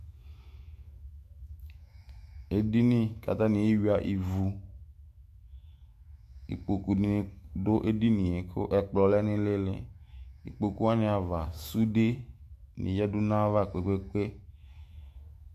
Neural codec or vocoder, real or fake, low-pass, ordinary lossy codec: autoencoder, 48 kHz, 128 numbers a frame, DAC-VAE, trained on Japanese speech; fake; 14.4 kHz; MP3, 64 kbps